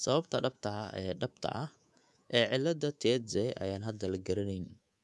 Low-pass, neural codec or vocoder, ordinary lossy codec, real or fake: none; codec, 24 kHz, 3.1 kbps, DualCodec; none; fake